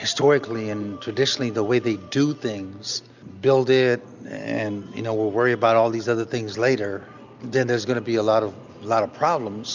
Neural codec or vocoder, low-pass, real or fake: none; 7.2 kHz; real